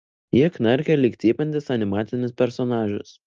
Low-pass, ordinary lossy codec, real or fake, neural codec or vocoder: 7.2 kHz; Opus, 24 kbps; real; none